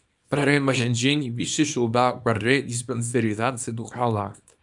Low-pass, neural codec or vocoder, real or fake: 10.8 kHz; codec, 24 kHz, 0.9 kbps, WavTokenizer, small release; fake